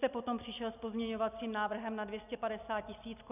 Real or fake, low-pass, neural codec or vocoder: real; 3.6 kHz; none